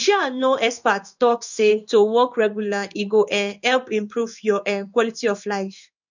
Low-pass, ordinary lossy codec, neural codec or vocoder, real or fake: 7.2 kHz; none; codec, 16 kHz in and 24 kHz out, 1 kbps, XY-Tokenizer; fake